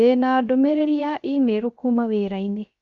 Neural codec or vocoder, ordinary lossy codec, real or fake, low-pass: codec, 16 kHz, 0.7 kbps, FocalCodec; Opus, 64 kbps; fake; 7.2 kHz